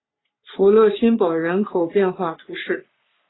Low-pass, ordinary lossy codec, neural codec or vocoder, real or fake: 7.2 kHz; AAC, 16 kbps; codec, 44.1 kHz, 3.4 kbps, Pupu-Codec; fake